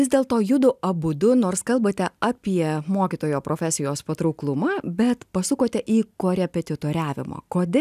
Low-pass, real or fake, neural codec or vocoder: 14.4 kHz; real; none